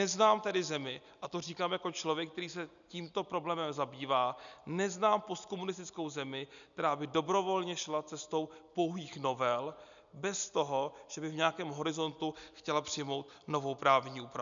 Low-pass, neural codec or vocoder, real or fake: 7.2 kHz; none; real